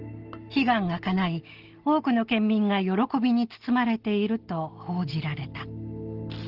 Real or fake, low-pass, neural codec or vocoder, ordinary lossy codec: real; 5.4 kHz; none; Opus, 16 kbps